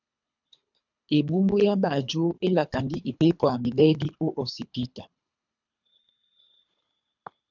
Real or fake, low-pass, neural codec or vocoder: fake; 7.2 kHz; codec, 24 kHz, 3 kbps, HILCodec